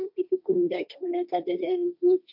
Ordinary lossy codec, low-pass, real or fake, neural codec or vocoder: none; 5.4 kHz; fake; codec, 16 kHz, 1.1 kbps, Voila-Tokenizer